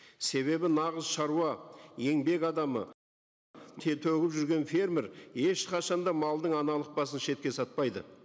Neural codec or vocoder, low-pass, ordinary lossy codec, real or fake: none; none; none; real